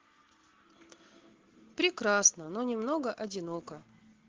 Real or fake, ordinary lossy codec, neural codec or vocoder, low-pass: real; Opus, 16 kbps; none; 7.2 kHz